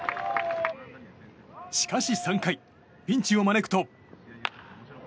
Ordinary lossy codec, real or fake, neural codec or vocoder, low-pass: none; real; none; none